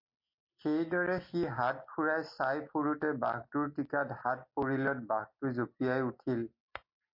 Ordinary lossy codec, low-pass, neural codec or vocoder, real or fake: MP3, 32 kbps; 5.4 kHz; none; real